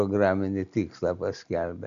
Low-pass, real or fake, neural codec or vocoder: 7.2 kHz; real; none